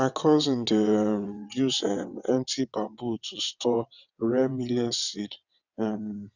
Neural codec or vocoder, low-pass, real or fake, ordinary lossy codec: vocoder, 22.05 kHz, 80 mel bands, WaveNeXt; 7.2 kHz; fake; none